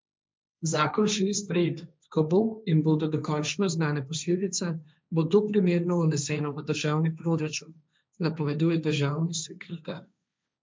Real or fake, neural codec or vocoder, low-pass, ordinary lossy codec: fake; codec, 16 kHz, 1.1 kbps, Voila-Tokenizer; none; none